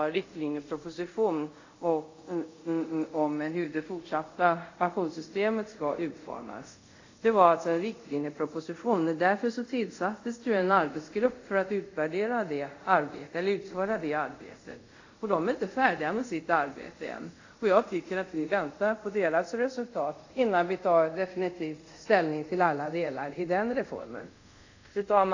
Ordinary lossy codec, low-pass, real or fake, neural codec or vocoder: AAC, 48 kbps; 7.2 kHz; fake; codec, 24 kHz, 0.5 kbps, DualCodec